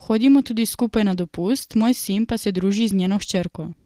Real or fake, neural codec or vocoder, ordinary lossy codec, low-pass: real; none; Opus, 16 kbps; 14.4 kHz